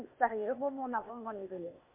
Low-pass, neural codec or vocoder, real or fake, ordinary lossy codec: 3.6 kHz; codec, 16 kHz, 0.8 kbps, ZipCodec; fake; MP3, 32 kbps